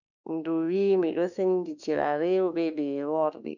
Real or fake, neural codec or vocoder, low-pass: fake; autoencoder, 48 kHz, 32 numbers a frame, DAC-VAE, trained on Japanese speech; 7.2 kHz